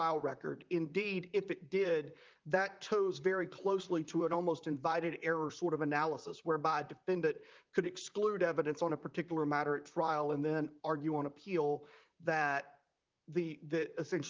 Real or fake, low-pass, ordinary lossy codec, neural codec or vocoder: fake; 7.2 kHz; Opus, 24 kbps; vocoder, 44.1 kHz, 128 mel bands, Pupu-Vocoder